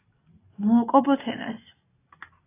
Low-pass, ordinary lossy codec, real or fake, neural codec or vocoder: 3.6 kHz; AAC, 16 kbps; real; none